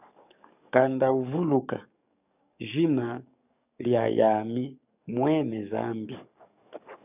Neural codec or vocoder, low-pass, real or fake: codec, 44.1 kHz, 7.8 kbps, DAC; 3.6 kHz; fake